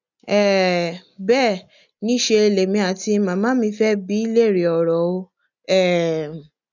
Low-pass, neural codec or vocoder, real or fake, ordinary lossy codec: 7.2 kHz; none; real; none